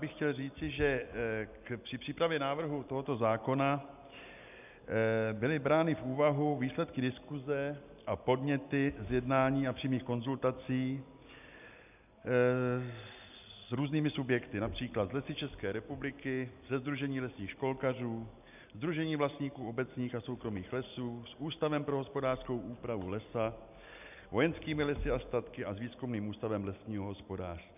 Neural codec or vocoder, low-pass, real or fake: none; 3.6 kHz; real